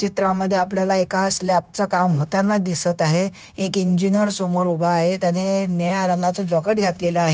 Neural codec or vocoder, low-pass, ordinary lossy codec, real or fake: codec, 16 kHz, 0.9 kbps, LongCat-Audio-Codec; none; none; fake